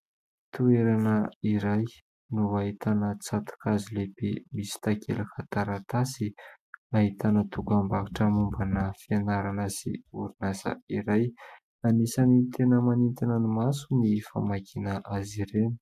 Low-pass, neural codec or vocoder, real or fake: 14.4 kHz; none; real